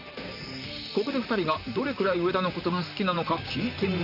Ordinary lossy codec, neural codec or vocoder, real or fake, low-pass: none; vocoder, 44.1 kHz, 128 mel bands, Pupu-Vocoder; fake; 5.4 kHz